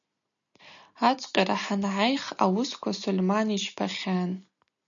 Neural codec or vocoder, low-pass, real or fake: none; 7.2 kHz; real